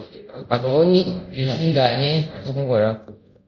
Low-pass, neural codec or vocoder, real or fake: 7.2 kHz; codec, 24 kHz, 0.5 kbps, DualCodec; fake